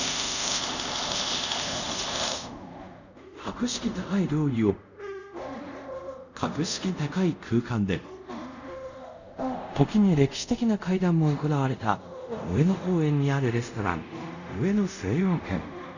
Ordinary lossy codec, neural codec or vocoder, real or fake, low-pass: none; codec, 24 kHz, 0.5 kbps, DualCodec; fake; 7.2 kHz